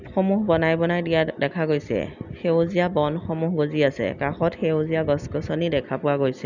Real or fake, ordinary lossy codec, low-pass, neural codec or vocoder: real; none; 7.2 kHz; none